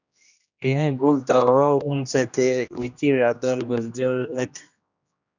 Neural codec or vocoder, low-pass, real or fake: codec, 16 kHz, 1 kbps, X-Codec, HuBERT features, trained on general audio; 7.2 kHz; fake